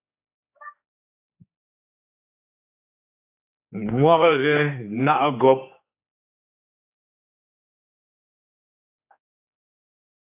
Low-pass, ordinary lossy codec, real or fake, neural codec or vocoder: 3.6 kHz; AAC, 24 kbps; fake; codec, 16 kHz, 2 kbps, X-Codec, HuBERT features, trained on general audio